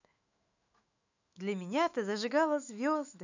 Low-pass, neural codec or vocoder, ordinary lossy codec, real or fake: 7.2 kHz; autoencoder, 48 kHz, 128 numbers a frame, DAC-VAE, trained on Japanese speech; none; fake